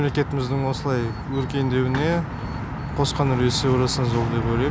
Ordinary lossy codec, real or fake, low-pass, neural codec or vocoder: none; real; none; none